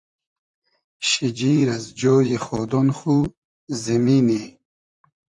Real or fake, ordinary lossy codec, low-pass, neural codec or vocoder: fake; AAC, 64 kbps; 10.8 kHz; vocoder, 44.1 kHz, 128 mel bands, Pupu-Vocoder